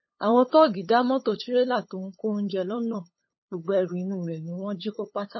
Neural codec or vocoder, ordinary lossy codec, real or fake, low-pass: codec, 16 kHz, 8 kbps, FunCodec, trained on LibriTTS, 25 frames a second; MP3, 24 kbps; fake; 7.2 kHz